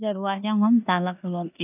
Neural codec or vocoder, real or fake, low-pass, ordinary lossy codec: codec, 16 kHz in and 24 kHz out, 0.9 kbps, LongCat-Audio-Codec, four codebook decoder; fake; 3.6 kHz; none